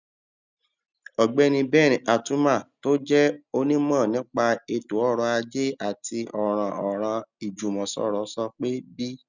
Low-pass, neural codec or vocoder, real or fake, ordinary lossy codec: 7.2 kHz; none; real; none